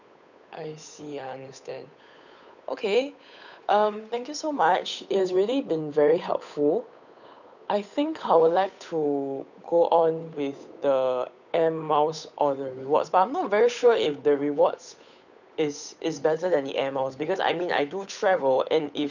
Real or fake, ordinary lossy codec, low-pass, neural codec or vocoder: fake; none; 7.2 kHz; codec, 16 kHz, 8 kbps, FunCodec, trained on Chinese and English, 25 frames a second